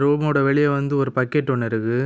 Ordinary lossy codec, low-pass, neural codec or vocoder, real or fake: none; none; none; real